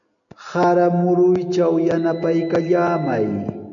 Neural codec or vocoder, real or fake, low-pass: none; real; 7.2 kHz